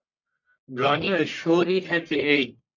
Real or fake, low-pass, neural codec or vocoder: fake; 7.2 kHz; codec, 44.1 kHz, 1.7 kbps, Pupu-Codec